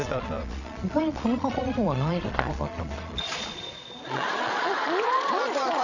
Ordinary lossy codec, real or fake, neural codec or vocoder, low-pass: none; fake; vocoder, 22.05 kHz, 80 mel bands, Vocos; 7.2 kHz